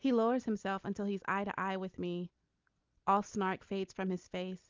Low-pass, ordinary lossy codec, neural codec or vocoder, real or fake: 7.2 kHz; Opus, 24 kbps; none; real